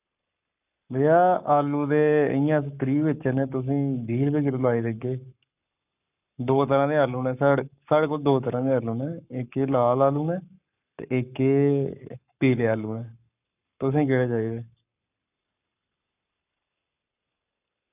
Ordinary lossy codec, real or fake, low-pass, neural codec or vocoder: none; fake; 3.6 kHz; codec, 44.1 kHz, 7.8 kbps, Pupu-Codec